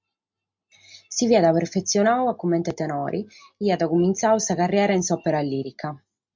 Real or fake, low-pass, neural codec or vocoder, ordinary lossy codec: real; 7.2 kHz; none; MP3, 64 kbps